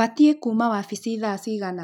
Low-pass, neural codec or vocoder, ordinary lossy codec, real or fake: 19.8 kHz; none; none; real